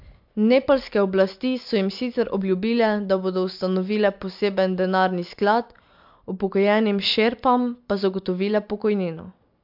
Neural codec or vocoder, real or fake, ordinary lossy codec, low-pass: none; real; MP3, 48 kbps; 5.4 kHz